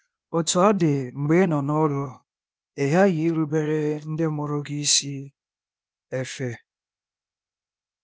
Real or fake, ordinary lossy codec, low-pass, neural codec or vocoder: fake; none; none; codec, 16 kHz, 0.8 kbps, ZipCodec